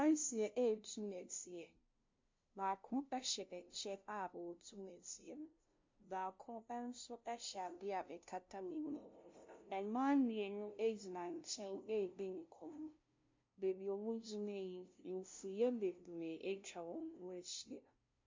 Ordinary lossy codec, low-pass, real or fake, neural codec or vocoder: MP3, 48 kbps; 7.2 kHz; fake; codec, 16 kHz, 0.5 kbps, FunCodec, trained on LibriTTS, 25 frames a second